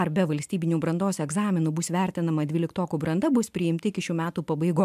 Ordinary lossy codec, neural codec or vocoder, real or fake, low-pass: MP3, 96 kbps; none; real; 14.4 kHz